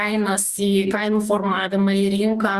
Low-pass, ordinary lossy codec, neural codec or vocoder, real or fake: 14.4 kHz; Opus, 64 kbps; codec, 44.1 kHz, 2.6 kbps, DAC; fake